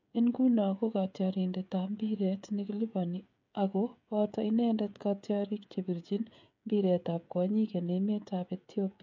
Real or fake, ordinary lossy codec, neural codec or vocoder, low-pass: fake; MP3, 64 kbps; codec, 16 kHz, 16 kbps, FreqCodec, smaller model; 7.2 kHz